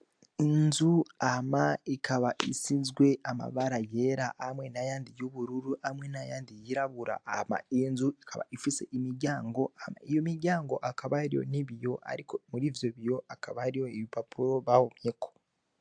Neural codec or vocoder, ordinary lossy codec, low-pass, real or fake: none; AAC, 64 kbps; 9.9 kHz; real